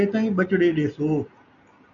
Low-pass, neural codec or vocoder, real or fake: 7.2 kHz; none; real